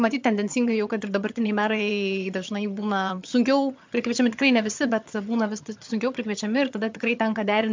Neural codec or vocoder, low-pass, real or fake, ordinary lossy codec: vocoder, 22.05 kHz, 80 mel bands, HiFi-GAN; 7.2 kHz; fake; MP3, 64 kbps